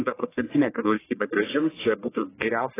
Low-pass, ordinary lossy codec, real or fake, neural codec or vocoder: 3.6 kHz; AAC, 16 kbps; fake; codec, 44.1 kHz, 1.7 kbps, Pupu-Codec